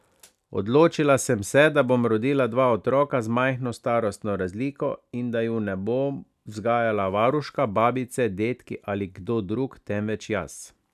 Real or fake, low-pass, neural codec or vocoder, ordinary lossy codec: real; 14.4 kHz; none; none